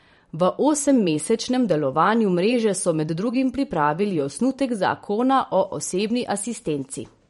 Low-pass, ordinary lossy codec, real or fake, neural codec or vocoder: 19.8 kHz; MP3, 48 kbps; fake; vocoder, 44.1 kHz, 128 mel bands every 512 samples, BigVGAN v2